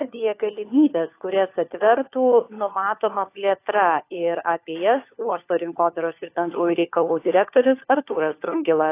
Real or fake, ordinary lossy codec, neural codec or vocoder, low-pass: fake; AAC, 24 kbps; codec, 16 kHz, 4 kbps, FunCodec, trained on LibriTTS, 50 frames a second; 3.6 kHz